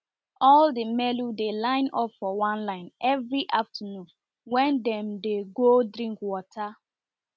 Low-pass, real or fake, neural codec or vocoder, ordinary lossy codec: none; real; none; none